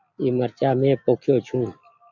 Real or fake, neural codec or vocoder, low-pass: real; none; 7.2 kHz